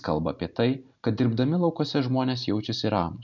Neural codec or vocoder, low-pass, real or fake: none; 7.2 kHz; real